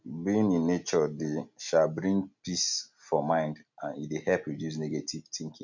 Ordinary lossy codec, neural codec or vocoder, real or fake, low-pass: none; none; real; 7.2 kHz